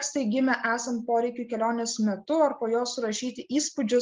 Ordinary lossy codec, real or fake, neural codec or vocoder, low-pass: Opus, 32 kbps; real; none; 7.2 kHz